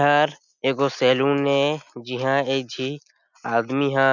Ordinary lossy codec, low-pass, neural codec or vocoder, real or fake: none; 7.2 kHz; none; real